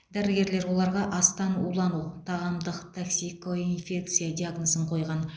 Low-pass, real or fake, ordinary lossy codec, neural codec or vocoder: none; real; none; none